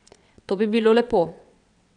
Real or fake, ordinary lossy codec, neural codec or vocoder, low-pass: fake; none; vocoder, 22.05 kHz, 80 mel bands, WaveNeXt; 9.9 kHz